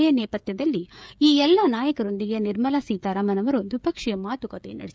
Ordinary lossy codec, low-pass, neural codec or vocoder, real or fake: none; none; codec, 16 kHz, 16 kbps, FreqCodec, smaller model; fake